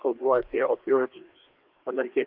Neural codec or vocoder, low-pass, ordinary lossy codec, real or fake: codec, 16 kHz, 2 kbps, FreqCodec, larger model; 5.4 kHz; Opus, 32 kbps; fake